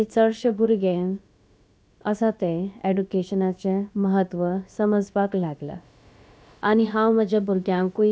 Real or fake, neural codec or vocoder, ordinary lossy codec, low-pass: fake; codec, 16 kHz, about 1 kbps, DyCAST, with the encoder's durations; none; none